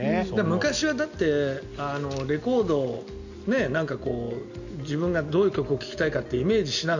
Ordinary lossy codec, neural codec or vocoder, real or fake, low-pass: none; none; real; 7.2 kHz